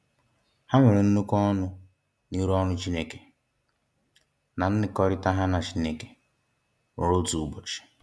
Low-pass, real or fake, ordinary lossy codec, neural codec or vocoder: none; real; none; none